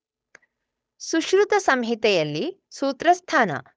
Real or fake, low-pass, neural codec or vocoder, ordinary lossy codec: fake; none; codec, 16 kHz, 8 kbps, FunCodec, trained on Chinese and English, 25 frames a second; none